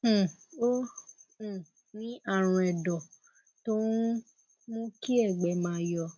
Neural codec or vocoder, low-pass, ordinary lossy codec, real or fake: none; none; none; real